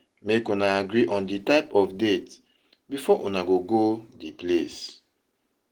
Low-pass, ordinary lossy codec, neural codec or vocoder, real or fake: 19.8 kHz; Opus, 16 kbps; none; real